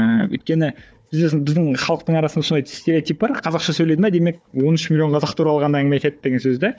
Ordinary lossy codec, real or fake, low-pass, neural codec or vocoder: none; fake; none; codec, 16 kHz, 4 kbps, FunCodec, trained on Chinese and English, 50 frames a second